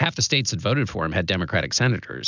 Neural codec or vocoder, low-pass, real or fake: none; 7.2 kHz; real